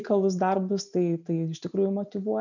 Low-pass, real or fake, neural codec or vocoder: 7.2 kHz; real; none